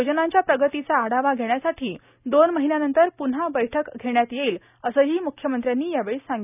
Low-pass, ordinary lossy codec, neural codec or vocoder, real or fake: 3.6 kHz; none; none; real